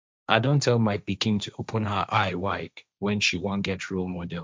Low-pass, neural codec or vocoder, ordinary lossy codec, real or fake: none; codec, 16 kHz, 1.1 kbps, Voila-Tokenizer; none; fake